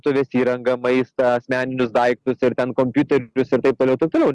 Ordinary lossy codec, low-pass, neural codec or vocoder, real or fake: Opus, 32 kbps; 10.8 kHz; none; real